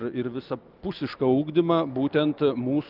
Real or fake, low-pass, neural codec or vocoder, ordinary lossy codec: real; 5.4 kHz; none; Opus, 32 kbps